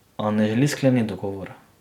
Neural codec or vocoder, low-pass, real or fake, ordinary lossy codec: none; 19.8 kHz; real; none